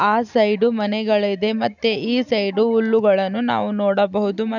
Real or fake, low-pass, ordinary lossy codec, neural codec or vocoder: real; 7.2 kHz; none; none